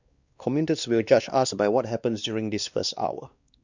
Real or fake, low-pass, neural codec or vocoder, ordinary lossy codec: fake; 7.2 kHz; codec, 16 kHz, 2 kbps, X-Codec, WavLM features, trained on Multilingual LibriSpeech; Opus, 64 kbps